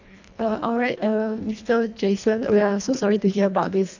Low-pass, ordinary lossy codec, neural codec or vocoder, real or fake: 7.2 kHz; none; codec, 24 kHz, 1.5 kbps, HILCodec; fake